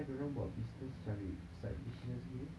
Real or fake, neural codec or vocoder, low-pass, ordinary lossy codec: real; none; none; none